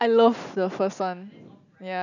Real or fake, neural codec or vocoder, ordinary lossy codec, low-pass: real; none; none; 7.2 kHz